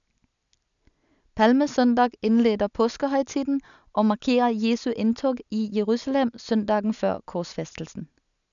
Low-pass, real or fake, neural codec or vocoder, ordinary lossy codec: 7.2 kHz; real; none; none